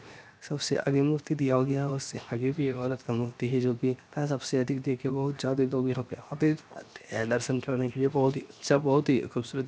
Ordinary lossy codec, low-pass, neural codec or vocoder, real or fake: none; none; codec, 16 kHz, 0.7 kbps, FocalCodec; fake